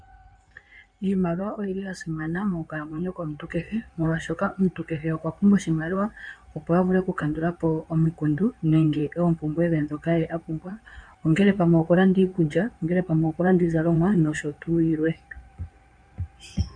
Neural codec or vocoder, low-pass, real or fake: codec, 16 kHz in and 24 kHz out, 2.2 kbps, FireRedTTS-2 codec; 9.9 kHz; fake